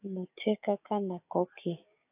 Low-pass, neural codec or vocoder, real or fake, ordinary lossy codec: 3.6 kHz; codec, 16 kHz, 6 kbps, DAC; fake; AAC, 24 kbps